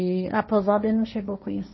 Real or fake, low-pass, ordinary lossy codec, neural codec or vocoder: fake; 7.2 kHz; MP3, 24 kbps; codec, 16 kHz, 1.1 kbps, Voila-Tokenizer